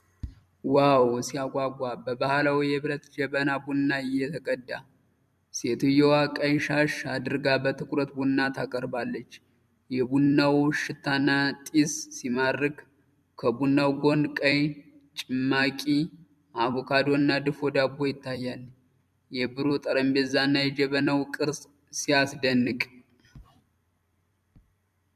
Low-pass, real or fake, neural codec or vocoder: 14.4 kHz; fake; vocoder, 44.1 kHz, 128 mel bands every 256 samples, BigVGAN v2